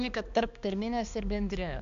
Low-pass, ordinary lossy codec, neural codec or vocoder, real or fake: 7.2 kHz; AAC, 96 kbps; codec, 16 kHz, 2 kbps, X-Codec, HuBERT features, trained on balanced general audio; fake